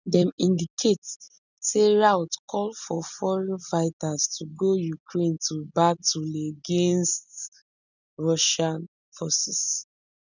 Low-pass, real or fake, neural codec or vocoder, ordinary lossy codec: 7.2 kHz; real; none; none